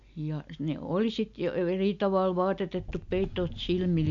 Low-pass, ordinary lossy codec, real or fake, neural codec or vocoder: 7.2 kHz; AAC, 64 kbps; real; none